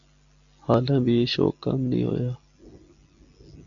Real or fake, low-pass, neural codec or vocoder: real; 7.2 kHz; none